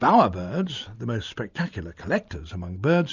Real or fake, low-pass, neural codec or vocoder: real; 7.2 kHz; none